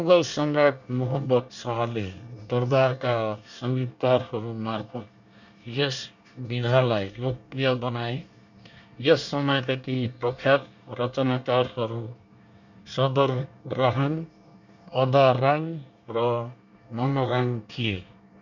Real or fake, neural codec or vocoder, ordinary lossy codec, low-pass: fake; codec, 24 kHz, 1 kbps, SNAC; none; 7.2 kHz